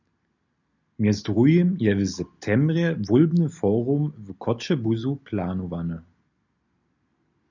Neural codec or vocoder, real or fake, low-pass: none; real; 7.2 kHz